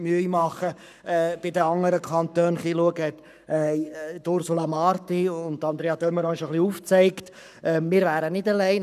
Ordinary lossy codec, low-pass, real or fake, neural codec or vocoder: none; 14.4 kHz; fake; codec, 44.1 kHz, 7.8 kbps, Pupu-Codec